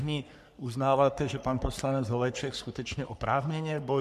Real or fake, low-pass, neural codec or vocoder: fake; 14.4 kHz; codec, 44.1 kHz, 3.4 kbps, Pupu-Codec